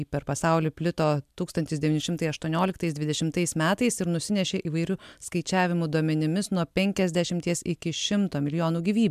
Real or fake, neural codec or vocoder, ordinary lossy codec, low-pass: real; none; MP3, 96 kbps; 14.4 kHz